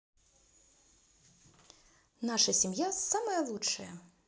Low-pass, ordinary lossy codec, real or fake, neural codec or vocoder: none; none; real; none